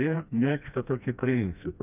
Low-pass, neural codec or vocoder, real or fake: 3.6 kHz; codec, 16 kHz, 1 kbps, FreqCodec, smaller model; fake